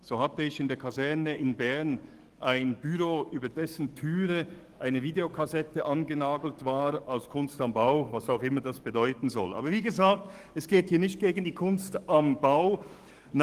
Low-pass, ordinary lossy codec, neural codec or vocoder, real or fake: 14.4 kHz; Opus, 24 kbps; codec, 44.1 kHz, 7.8 kbps, DAC; fake